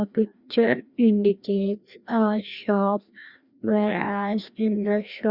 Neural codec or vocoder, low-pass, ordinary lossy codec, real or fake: codec, 16 kHz, 1 kbps, FreqCodec, larger model; 5.4 kHz; Opus, 64 kbps; fake